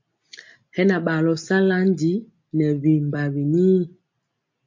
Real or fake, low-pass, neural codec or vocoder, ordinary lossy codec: real; 7.2 kHz; none; MP3, 48 kbps